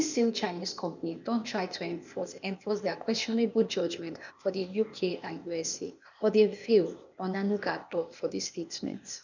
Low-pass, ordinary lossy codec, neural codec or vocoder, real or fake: 7.2 kHz; none; codec, 16 kHz, 0.8 kbps, ZipCodec; fake